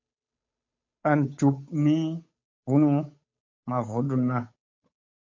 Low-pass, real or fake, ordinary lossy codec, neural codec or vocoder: 7.2 kHz; fake; MP3, 48 kbps; codec, 16 kHz, 8 kbps, FunCodec, trained on Chinese and English, 25 frames a second